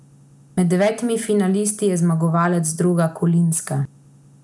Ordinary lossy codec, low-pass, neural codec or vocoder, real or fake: none; none; none; real